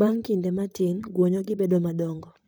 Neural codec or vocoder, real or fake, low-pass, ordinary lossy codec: vocoder, 44.1 kHz, 128 mel bands, Pupu-Vocoder; fake; none; none